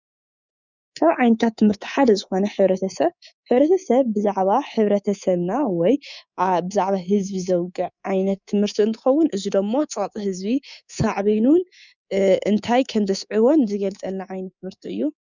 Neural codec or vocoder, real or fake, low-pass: codec, 24 kHz, 3.1 kbps, DualCodec; fake; 7.2 kHz